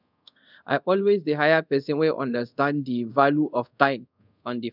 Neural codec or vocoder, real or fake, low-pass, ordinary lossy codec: codec, 24 kHz, 0.5 kbps, DualCodec; fake; 5.4 kHz; none